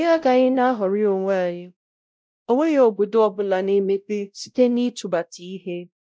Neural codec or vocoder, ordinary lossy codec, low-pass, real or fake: codec, 16 kHz, 0.5 kbps, X-Codec, WavLM features, trained on Multilingual LibriSpeech; none; none; fake